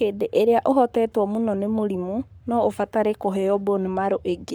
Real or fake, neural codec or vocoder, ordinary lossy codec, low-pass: fake; codec, 44.1 kHz, 7.8 kbps, Pupu-Codec; none; none